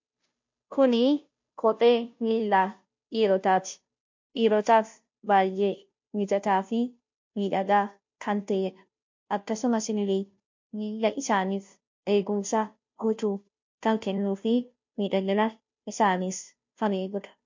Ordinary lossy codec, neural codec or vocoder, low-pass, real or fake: MP3, 48 kbps; codec, 16 kHz, 0.5 kbps, FunCodec, trained on Chinese and English, 25 frames a second; 7.2 kHz; fake